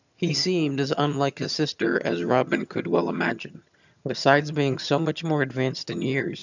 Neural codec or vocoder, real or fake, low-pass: vocoder, 22.05 kHz, 80 mel bands, HiFi-GAN; fake; 7.2 kHz